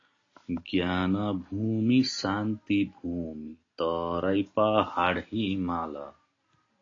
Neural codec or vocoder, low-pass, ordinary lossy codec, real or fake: none; 7.2 kHz; AAC, 32 kbps; real